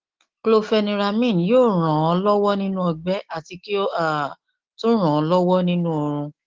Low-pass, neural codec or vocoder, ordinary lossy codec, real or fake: 7.2 kHz; autoencoder, 48 kHz, 128 numbers a frame, DAC-VAE, trained on Japanese speech; Opus, 16 kbps; fake